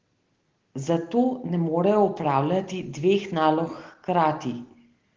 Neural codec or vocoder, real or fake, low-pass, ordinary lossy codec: none; real; 7.2 kHz; Opus, 16 kbps